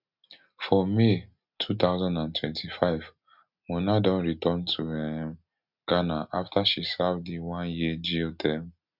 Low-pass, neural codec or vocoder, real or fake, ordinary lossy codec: 5.4 kHz; none; real; none